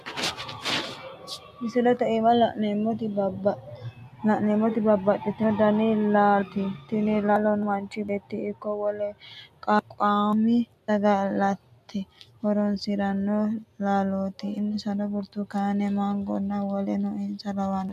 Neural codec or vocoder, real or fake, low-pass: none; real; 14.4 kHz